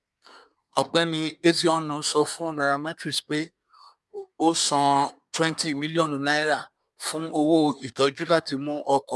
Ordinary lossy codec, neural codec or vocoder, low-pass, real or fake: none; codec, 24 kHz, 1 kbps, SNAC; none; fake